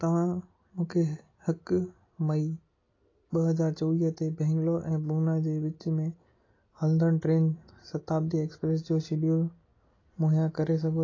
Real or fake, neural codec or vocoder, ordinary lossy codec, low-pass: real; none; AAC, 32 kbps; 7.2 kHz